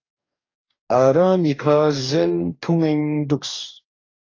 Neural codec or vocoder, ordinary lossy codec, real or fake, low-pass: codec, 44.1 kHz, 2.6 kbps, DAC; AAC, 32 kbps; fake; 7.2 kHz